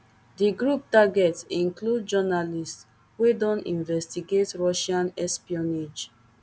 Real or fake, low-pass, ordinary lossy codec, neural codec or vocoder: real; none; none; none